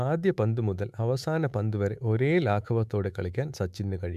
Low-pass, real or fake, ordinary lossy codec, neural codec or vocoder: 14.4 kHz; fake; none; autoencoder, 48 kHz, 128 numbers a frame, DAC-VAE, trained on Japanese speech